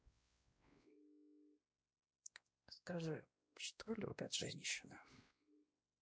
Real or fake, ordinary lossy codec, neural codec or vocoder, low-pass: fake; none; codec, 16 kHz, 1 kbps, X-Codec, WavLM features, trained on Multilingual LibriSpeech; none